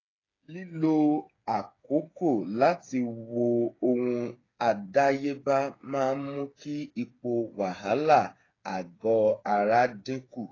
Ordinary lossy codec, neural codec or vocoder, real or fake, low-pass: AAC, 32 kbps; codec, 16 kHz, 8 kbps, FreqCodec, smaller model; fake; 7.2 kHz